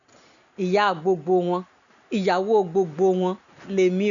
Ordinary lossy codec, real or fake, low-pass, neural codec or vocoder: none; real; 7.2 kHz; none